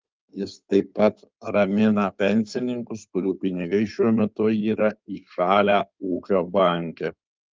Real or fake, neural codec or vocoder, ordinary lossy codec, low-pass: fake; codec, 16 kHz in and 24 kHz out, 2.2 kbps, FireRedTTS-2 codec; Opus, 24 kbps; 7.2 kHz